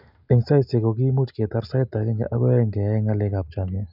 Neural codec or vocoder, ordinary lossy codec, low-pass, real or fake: none; none; 5.4 kHz; real